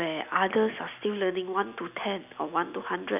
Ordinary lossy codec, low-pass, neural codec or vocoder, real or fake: none; 3.6 kHz; none; real